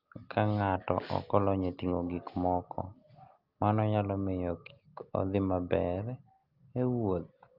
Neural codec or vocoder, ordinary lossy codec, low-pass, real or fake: none; Opus, 24 kbps; 5.4 kHz; real